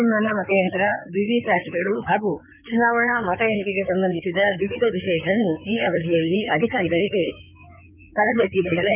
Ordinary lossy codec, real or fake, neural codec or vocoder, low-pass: none; fake; codec, 16 kHz in and 24 kHz out, 2.2 kbps, FireRedTTS-2 codec; 3.6 kHz